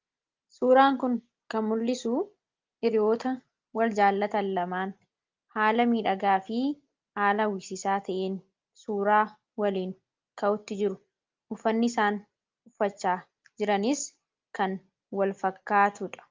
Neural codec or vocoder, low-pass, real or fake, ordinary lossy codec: none; 7.2 kHz; real; Opus, 24 kbps